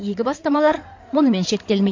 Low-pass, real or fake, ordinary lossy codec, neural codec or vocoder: 7.2 kHz; fake; MP3, 48 kbps; codec, 16 kHz in and 24 kHz out, 2.2 kbps, FireRedTTS-2 codec